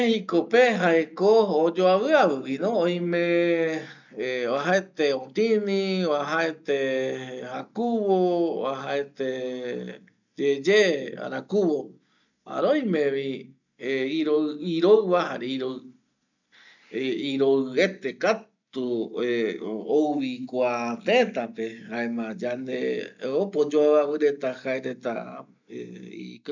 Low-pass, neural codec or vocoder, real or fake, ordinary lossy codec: 7.2 kHz; none; real; none